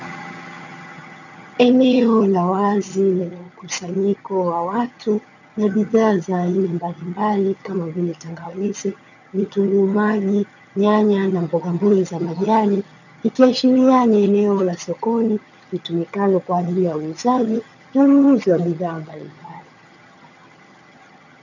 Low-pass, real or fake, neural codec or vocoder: 7.2 kHz; fake; vocoder, 22.05 kHz, 80 mel bands, HiFi-GAN